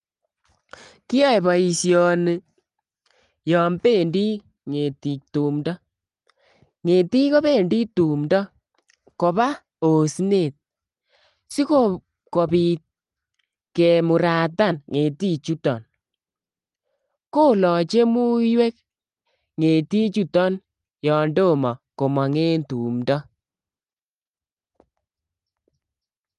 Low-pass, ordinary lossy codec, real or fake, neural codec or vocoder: 10.8 kHz; Opus, 32 kbps; real; none